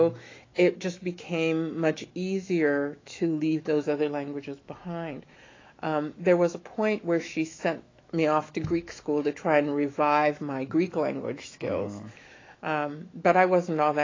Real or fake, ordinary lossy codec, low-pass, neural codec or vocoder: fake; AAC, 32 kbps; 7.2 kHz; autoencoder, 48 kHz, 128 numbers a frame, DAC-VAE, trained on Japanese speech